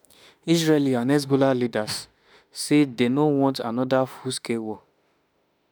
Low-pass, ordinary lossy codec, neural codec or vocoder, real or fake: none; none; autoencoder, 48 kHz, 32 numbers a frame, DAC-VAE, trained on Japanese speech; fake